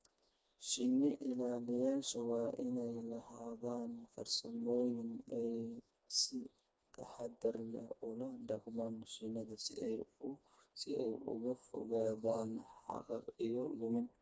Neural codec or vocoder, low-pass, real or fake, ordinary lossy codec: codec, 16 kHz, 2 kbps, FreqCodec, smaller model; none; fake; none